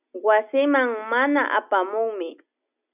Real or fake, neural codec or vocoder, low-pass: real; none; 3.6 kHz